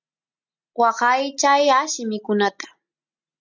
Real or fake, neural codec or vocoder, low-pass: real; none; 7.2 kHz